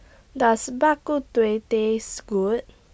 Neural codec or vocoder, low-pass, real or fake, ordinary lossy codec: none; none; real; none